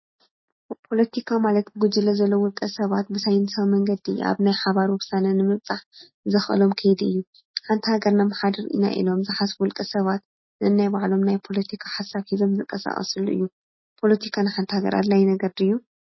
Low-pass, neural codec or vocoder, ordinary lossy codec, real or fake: 7.2 kHz; none; MP3, 24 kbps; real